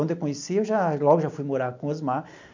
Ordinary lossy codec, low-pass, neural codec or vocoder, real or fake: MP3, 48 kbps; 7.2 kHz; none; real